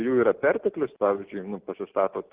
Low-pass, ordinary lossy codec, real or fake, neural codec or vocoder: 3.6 kHz; Opus, 16 kbps; real; none